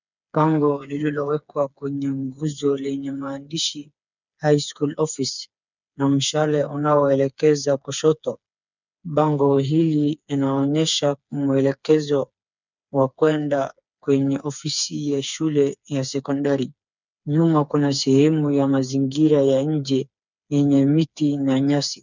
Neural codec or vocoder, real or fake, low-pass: codec, 16 kHz, 4 kbps, FreqCodec, smaller model; fake; 7.2 kHz